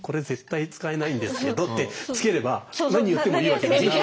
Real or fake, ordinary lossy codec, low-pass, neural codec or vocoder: real; none; none; none